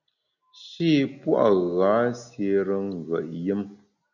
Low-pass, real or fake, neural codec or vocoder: 7.2 kHz; real; none